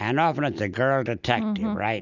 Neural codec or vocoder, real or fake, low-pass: none; real; 7.2 kHz